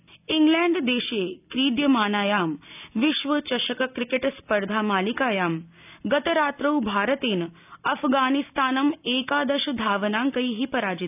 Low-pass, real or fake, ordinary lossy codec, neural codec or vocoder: 3.6 kHz; real; none; none